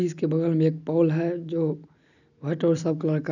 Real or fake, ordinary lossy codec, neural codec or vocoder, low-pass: real; none; none; 7.2 kHz